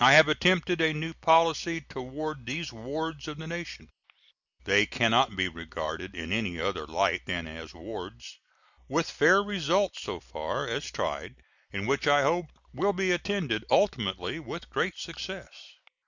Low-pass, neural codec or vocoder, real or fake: 7.2 kHz; none; real